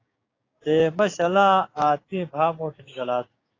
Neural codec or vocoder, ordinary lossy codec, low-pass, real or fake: codec, 16 kHz, 6 kbps, DAC; AAC, 32 kbps; 7.2 kHz; fake